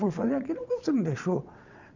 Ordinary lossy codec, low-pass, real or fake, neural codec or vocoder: none; 7.2 kHz; fake; vocoder, 22.05 kHz, 80 mel bands, WaveNeXt